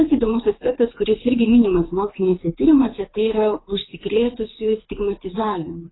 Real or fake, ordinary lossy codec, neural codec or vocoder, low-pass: fake; AAC, 16 kbps; codec, 24 kHz, 6 kbps, HILCodec; 7.2 kHz